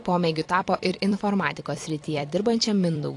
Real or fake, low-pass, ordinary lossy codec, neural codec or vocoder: fake; 10.8 kHz; AAC, 48 kbps; vocoder, 44.1 kHz, 128 mel bands every 256 samples, BigVGAN v2